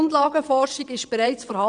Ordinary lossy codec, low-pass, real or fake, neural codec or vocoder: none; 9.9 kHz; fake; vocoder, 22.05 kHz, 80 mel bands, WaveNeXt